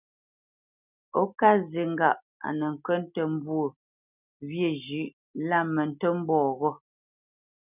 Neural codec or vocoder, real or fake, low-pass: none; real; 3.6 kHz